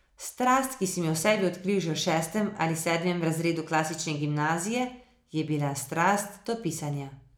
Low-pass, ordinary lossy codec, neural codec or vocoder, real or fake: none; none; none; real